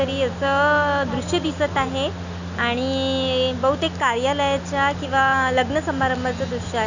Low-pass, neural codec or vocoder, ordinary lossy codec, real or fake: 7.2 kHz; none; none; real